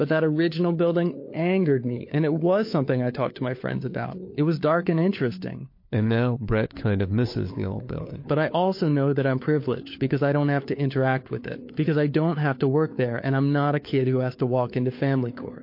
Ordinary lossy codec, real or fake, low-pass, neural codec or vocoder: MP3, 32 kbps; fake; 5.4 kHz; codec, 16 kHz, 4 kbps, FunCodec, trained on LibriTTS, 50 frames a second